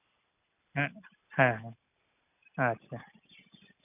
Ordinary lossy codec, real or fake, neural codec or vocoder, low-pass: none; real; none; 3.6 kHz